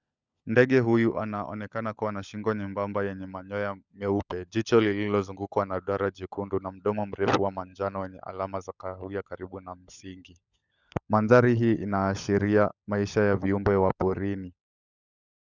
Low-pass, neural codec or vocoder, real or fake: 7.2 kHz; codec, 16 kHz, 16 kbps, FunCodec, trained on LibriTTS, 50 frames a second; fake